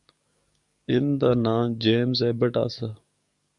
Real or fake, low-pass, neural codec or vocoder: fake; 10.8 kHz; codec, 44.1 kHz, 7.8 kbps, DAC